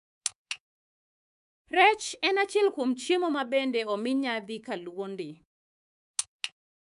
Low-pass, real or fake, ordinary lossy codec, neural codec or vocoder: 10.8 kHz; fake; none; codec, 24 kHz, 3.1 kbps, DualCodec